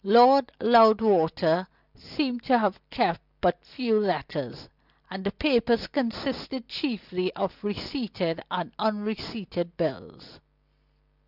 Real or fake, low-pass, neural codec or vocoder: real; 5.4 kHz; none